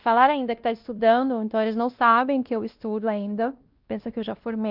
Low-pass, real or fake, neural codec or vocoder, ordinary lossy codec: 5.4 kHz; fake; codec, 16 kHz, 1 kbps, X-Codec, WavLM features, trained on Multilingual LibriSpeech; Opus, 32 kbps